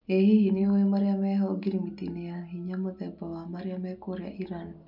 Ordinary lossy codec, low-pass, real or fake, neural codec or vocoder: none; 5.4 kHz; real; none